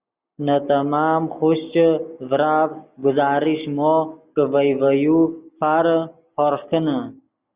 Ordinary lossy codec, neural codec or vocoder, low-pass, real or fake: Opus, 64 kbps; none; 3.6 kHz; real